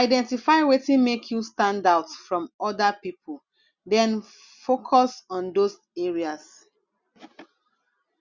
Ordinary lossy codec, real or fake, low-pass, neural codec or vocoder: Opus, 64 kbps; real; 7.2 kHz; none